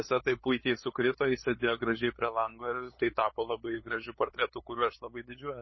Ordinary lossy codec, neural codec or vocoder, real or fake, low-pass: MP3, 24 kbps; codec, 16 kHz, 8 kbps, FunCodec, trained on LibriTTS, 25 frames a second; fake; 7.2 kHz